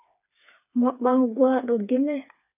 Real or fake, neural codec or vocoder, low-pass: fake; codec, 16 kHz, 4 kbps, FreqCodec, smaller model; 3.6 kHz